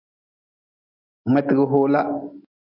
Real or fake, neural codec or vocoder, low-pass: real; none; 5.4 kHz